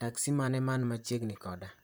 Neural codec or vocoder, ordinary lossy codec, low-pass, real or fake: vocoder, 44.1 kHz, 128 mel bands, Pupu-Vocoder; none; none; fake